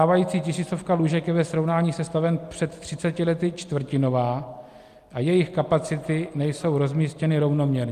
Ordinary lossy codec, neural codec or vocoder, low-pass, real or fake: Opus, 32 kbps; none; 14.4 kHz; real